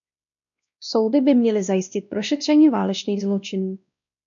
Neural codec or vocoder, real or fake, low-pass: codec, 16 kHz, 1 kbps, X-Codec, WavLM features, trained on Multilingual LibriSpeech; fake; 7.2 kHz